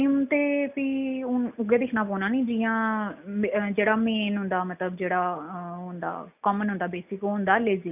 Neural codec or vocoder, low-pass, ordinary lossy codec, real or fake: none; 3.6 kHz; none; real